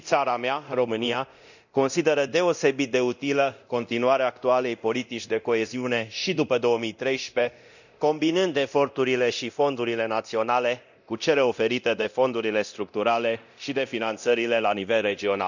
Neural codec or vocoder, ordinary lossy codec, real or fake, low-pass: codec, 24 kHz, 0.9 kbps, DualCodec; none; fake; 7.2 kHz